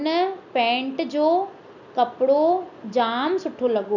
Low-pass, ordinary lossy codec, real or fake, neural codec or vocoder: 7.2 kHz; none; real; none